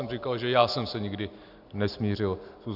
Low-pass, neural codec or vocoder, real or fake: 5.4 kHz; none; real